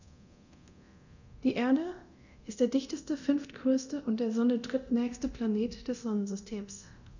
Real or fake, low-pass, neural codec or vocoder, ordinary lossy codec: fake; 7.2 kHz; codec, 24 kHz, 0.9 kbps, DualCodec; none